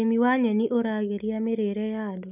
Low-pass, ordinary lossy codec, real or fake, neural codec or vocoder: 3.6 kHz; none; real; none